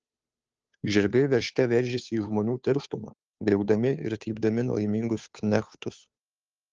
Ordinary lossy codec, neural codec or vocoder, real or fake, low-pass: Opus, 24 kbps; codec, 16 kHz, 2 kbps, FunCodec, trained on Chinese and English, 25 frames a second; fake; 7.2 kHz